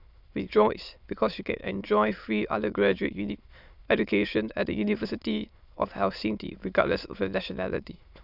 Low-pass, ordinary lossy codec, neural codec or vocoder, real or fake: 5.4 kHz; none; autoencoder, 22.05 kHz, a latent of 192 numbers a frame, VITS, trained on many speakers; fake